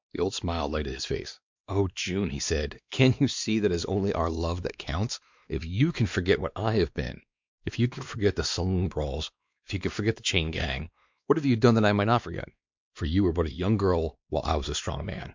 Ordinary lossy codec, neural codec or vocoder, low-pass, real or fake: MP3, 64 kbps; codec, 16 kHz, 2 kbps, X-Codec, WavLM features, trained on Multilingual LibriSpeech; 7.2 kHz; fake